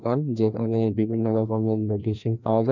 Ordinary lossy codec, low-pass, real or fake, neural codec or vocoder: none; 7.2 kHz; fake; codec, 16 kHz, 1 kbps, FreqCodec, larger model